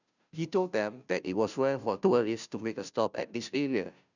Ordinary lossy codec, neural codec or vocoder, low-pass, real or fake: none; codec, 16 kHz, 0.5 kbps, FunCodec, trained on Chinese and English, 25 frames a second; 7.2 kHz; fake